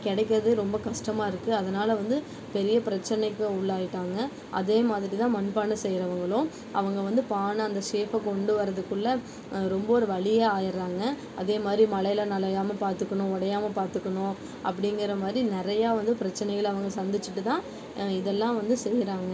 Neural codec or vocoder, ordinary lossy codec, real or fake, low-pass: none; none; real; none